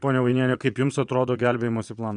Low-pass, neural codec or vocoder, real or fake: 9.9 kHz; vocoder, 22.05 kHz, 80 mel bands, Vocos; fake